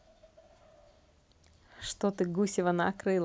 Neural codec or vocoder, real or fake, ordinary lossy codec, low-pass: none; real; none; none